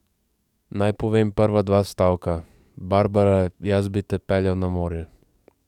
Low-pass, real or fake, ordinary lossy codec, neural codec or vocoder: 19.8 kHz; fake; none; codec, 44.1 kHz, 7.8 kbps, DAC